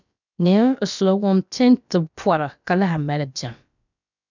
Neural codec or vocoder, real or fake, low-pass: codec, 16 kHz, about 1 kbps, DyCAST, with the encoder's durations; fake; 7.2 kHz